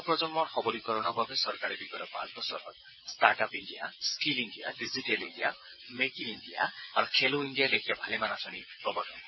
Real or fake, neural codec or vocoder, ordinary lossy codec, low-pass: fake; vocoder, 22.05 kHz, 80 mel bands, WaveNeXt; MP3, 24 kbps; 7.2 kHz